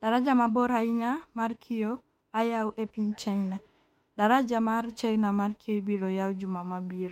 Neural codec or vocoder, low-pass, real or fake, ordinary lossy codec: autoencoder, 48 kHz, 32 numbers a frame, DAC-VAE, trained on Japanese speech; 19.8 kHz; fake; MP3, 64 kbps